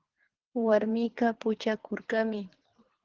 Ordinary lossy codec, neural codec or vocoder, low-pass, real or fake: Opus, 16 kbps; codec, 24 kHz, 3 kbps, HILCodec; 7.2 kHz; fake